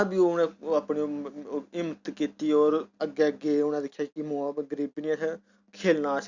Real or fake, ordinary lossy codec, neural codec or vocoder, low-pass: real; Opus, 64 kbps; none; 7.2 kHz